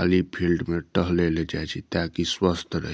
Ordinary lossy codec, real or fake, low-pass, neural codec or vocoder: none; real; none; none